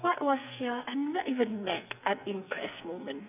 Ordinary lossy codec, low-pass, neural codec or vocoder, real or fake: none; 3.6 kHz; codec, 44.1 kHz, 2.6 kbps, SNAC; fake